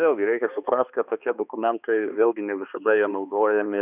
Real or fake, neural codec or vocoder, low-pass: fake; codec, 16 kHz, 2 kbps, X-Codec, HuBERT features, trained on balanced general audio; 3.6 kHz